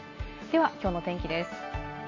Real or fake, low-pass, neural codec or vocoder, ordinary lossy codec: real; 7.2 kHz; none; AAC, 32 kbps